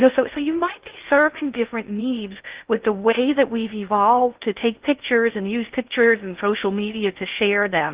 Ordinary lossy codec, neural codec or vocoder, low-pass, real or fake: Opus, 32 kbps; codec, 16 kHz in and 24 kHz out, 0.6 kbps, FocalCodec, streaming, 2048 codes; 3.6 kHz; fake